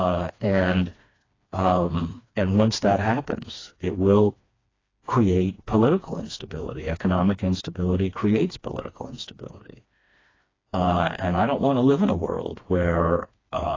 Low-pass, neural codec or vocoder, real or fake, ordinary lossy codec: 7.2 kHz; codec, 16 kHz, 2 kbps, FreqCodec, smaller model; fake; AAC, 32 kbps